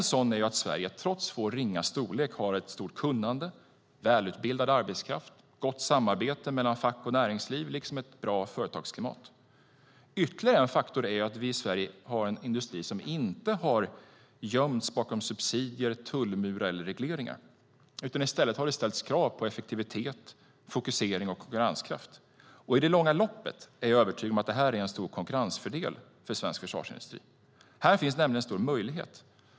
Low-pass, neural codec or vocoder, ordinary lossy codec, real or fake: none; none; none; real